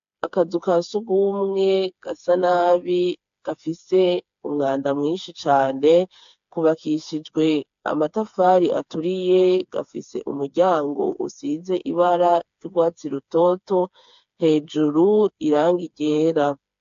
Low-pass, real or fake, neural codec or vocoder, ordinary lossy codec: 7.2 kHz; fake; codec, 16 kHz, 4 kbps, FreqCodec, smaller model; AAC, 64 kbps